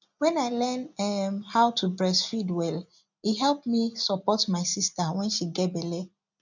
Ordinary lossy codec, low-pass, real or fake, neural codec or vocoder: none; 7.2 kHz; real; none